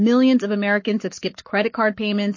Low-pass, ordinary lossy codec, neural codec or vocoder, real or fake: 7.2 kHz; MP3, 32 kbps; codec, 44.1 kHz, 7.8 kbps, Pupu-Codec; fake